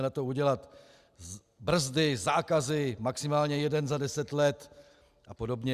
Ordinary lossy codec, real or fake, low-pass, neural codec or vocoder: Opus, 64 kbps; real; 14.4 kHz; none